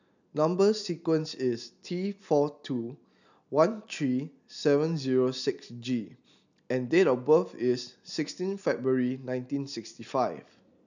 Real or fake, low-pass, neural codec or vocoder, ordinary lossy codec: real; 7.2 kHz; none; none